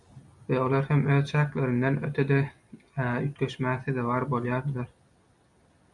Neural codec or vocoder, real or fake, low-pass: none; real; 10.8 kHz